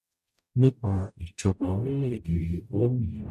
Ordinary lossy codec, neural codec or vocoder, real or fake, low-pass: none; codec, 44.1 kHz, 0.9 kbps, DAC; fake; 14.4 kHz